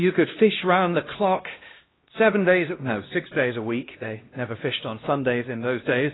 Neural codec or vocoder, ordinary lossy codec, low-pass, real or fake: codec, 16 kHz, 1 kbps, X-Codec, HuBERT features, trained on LibriSpeech; AAC, 16 kbps; 7.2 kHz; fake